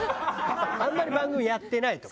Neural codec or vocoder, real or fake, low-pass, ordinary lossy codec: none; real; none; none